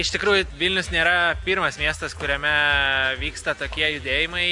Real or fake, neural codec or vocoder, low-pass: real; none; 10.8 kHz